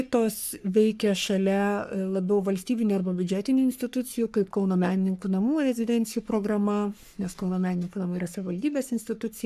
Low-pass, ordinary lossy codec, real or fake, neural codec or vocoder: 14.4 kHz; AAC, 96 kbps; fake; codec, 44.1 kHz, 3.4 kbps, Pupu-Codec